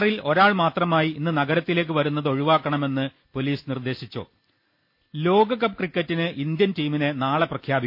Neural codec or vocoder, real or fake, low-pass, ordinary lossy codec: none; real; 5.4 kHz; MP3, 32 kbps